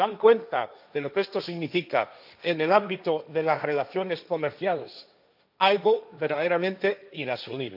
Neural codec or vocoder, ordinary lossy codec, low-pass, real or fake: codec, 16 kHz, 1.1 kbps, Voila-Tokenizer; none; 5.4 kHz; fake